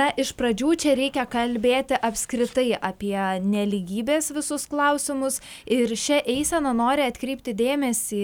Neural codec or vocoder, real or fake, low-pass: none; real; 19.8 kHz